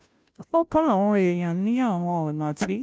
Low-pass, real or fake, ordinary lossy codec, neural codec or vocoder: none; fake; none; codec, 16 kHz, 0.5 kbps, FunCodec, trained on Chinese and English, 25 frames a second